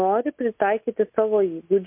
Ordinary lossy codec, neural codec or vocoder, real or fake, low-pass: MP3, 32 kbps; none; real; 3.6 kHz